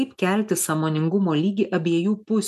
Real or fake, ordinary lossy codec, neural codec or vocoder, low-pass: real; MP3, 96 kbps; none; 14.4 kHz